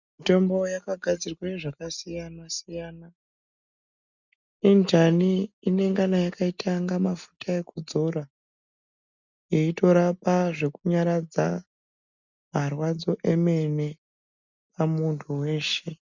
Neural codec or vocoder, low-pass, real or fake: none; 7.2 kHz; real